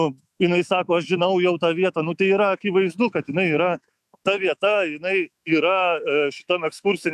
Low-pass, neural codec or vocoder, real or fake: 14.4 kHz; autoencoder, 48 kHz, 128 numbers a frame, DAC-VAE, trained on Japanese speech; fake